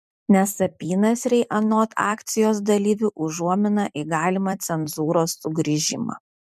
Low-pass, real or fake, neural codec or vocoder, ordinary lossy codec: 14.4 kHz; fake; vocoder, 44.1 kHz, 128 mel bands every 256 samples, BigVGAN v2; MP3, 96 kbps